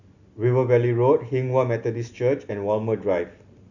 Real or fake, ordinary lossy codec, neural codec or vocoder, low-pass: real; none; none; 7.2 kHz